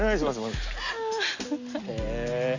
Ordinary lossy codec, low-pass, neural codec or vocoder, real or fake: Opus, 64 kbps; 7.2 kHz; none; real